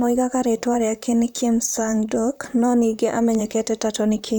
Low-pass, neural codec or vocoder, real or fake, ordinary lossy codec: none; vocoder, 44.1 kHz, 128 mel bands, Pupu-Vocoder; fake; none